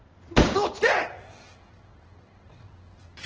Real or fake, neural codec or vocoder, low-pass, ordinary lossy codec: fake; codec, 16 kHz in and 24 kHz out, 1 kbps, XY-Tokenizer; 7.2 kHz; Opus, 16 kbps